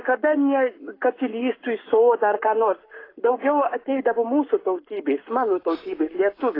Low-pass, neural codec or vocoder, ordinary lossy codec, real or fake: 5.4 kHz; codec, 44.1 kHz, 7.8 kbps, Pupu-Codec; AAC, 24 kbps; fake